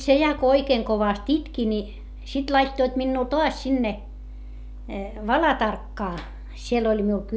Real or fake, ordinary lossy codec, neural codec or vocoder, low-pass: real; none; none; none